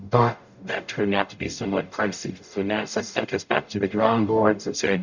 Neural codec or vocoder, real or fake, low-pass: codec, 44.1 kHz, 0.9 kbps, DAC; fake; 7.2 kHz